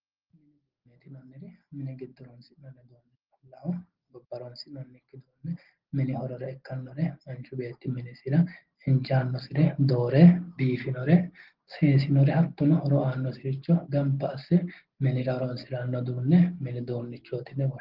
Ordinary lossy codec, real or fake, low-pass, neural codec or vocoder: Opus, 16 kbps; real; 5.4 kHz; none